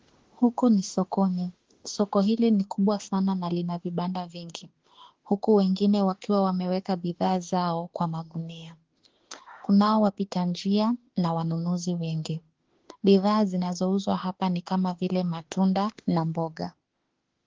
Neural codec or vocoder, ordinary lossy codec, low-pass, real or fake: autoencoder, 48 kHz, 32 numbers a frame, DAC-VAE, trained on Japanese speech; Opus, 16 kbps; 7.2 kHz; fake